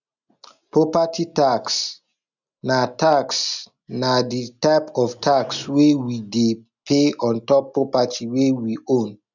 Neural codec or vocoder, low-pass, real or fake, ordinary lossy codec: none; 7.2 kHz; real; none